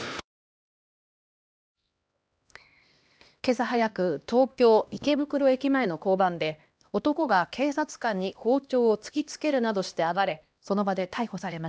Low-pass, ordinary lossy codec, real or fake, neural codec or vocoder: none; none; fake; codec, 16 kHz, 1 kbps, X-Codec, HuBERT features, trained on LibriSpeech